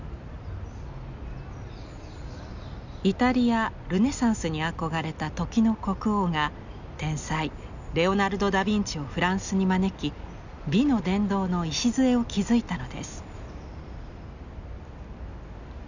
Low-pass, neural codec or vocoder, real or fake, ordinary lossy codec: 7.2 kHz; none; real; none